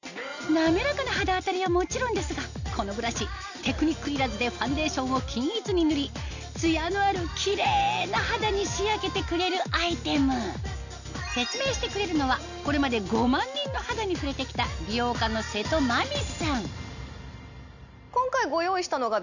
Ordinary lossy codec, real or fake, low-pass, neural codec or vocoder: none; real; 7.2 kHz; none